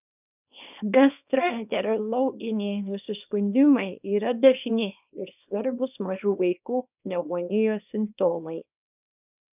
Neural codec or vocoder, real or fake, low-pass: codec, 24 kHz, 0.9 kbps, WavTokenizer, small release; fake; 3.6 kHz